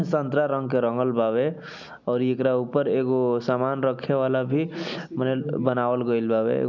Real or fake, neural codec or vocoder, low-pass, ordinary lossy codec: real; none; 7.2 kHz; none